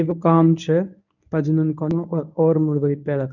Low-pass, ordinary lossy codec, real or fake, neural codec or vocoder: 7.2 kHz; none; fake; codec, 24 kHz, 0.9 kbps, WavTokenizer, medium speech release version 1